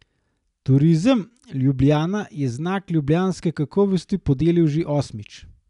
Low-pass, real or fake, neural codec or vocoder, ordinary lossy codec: 10.8 kHz; real; none; none